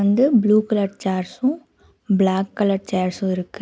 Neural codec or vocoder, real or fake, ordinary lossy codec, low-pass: none; real; none; none